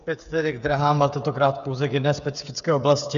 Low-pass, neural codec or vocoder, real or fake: 7.2 kHz; codec, 16 kHz, 8 kbps, FreqCodec, smaller model; fake